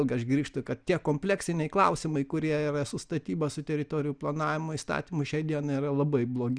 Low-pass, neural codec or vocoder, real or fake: 9.9 kHz; none; real